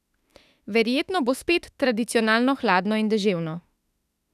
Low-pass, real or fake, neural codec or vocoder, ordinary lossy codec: 14.4 kHz; fake; autoencoder, 48 kHz, 32 numbers a frame, DAC-VAE, trained on Japanese speech; none